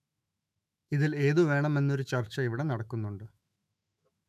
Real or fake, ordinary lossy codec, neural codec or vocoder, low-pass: fake; none; autoencoder, 48 kHz, 128 numbers a frame, DAC-VAE, trained on Japanese speech; 14.4 kHz